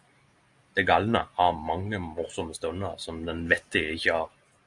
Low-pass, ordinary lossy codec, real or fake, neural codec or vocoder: 10.8 kHz; Opus, 64 kbps; real; none